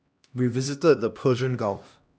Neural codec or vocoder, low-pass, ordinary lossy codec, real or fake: codec, 16 kHz, 1 kbps, X-Codec, HuBERT features, trained on LibriSpeech; none; none; fake